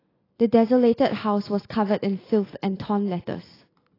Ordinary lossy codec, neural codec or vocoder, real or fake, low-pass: AAC, 24 kbps; none; real; 5.4 kHz